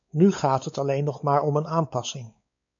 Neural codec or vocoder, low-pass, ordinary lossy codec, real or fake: codec, 16 kHz, 4 kbps, X-Codec, WavLM features, trained on Multilingual LibriSpeech; 7.2 kHz; MP3, 64 kbps; fake